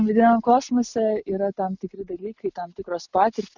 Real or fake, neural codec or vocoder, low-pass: real; none; 7.2 kHz